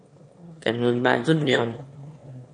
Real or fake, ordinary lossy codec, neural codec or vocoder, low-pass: fake; MP3, 48 kbps; autoencoder, 22.05 kHz, a latent of 192 numbers a frame, VITS, trained on one speaker; 9.9 kHz